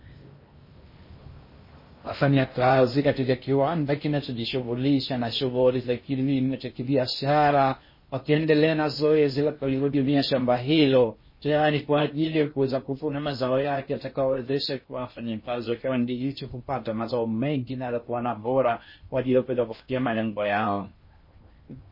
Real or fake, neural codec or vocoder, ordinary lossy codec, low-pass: fake; codec, 16 kHz in and 24 kHz out, 0.6 kbps, FocalCodec, streaming, 2048 codes; MP3, 24 kbps; 5.4 kHz